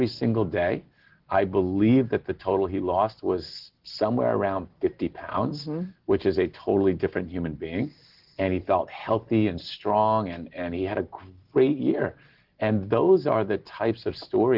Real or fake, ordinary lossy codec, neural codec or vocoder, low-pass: real; Opus, 16 kbps; none; 5.4 kHz